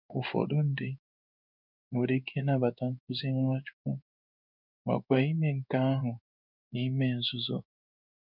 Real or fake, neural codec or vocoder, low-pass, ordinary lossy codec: fake; codec, 16 kHz in and 24 kHz out, 1 kbps, XY-Tokenizer; 5.4 kHz; none